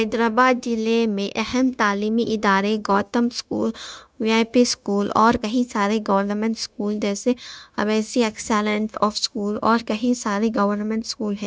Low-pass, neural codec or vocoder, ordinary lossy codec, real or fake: none; codec, 16 kHz, 0.9 kbps, LongCat-Audio-Codec; none; fake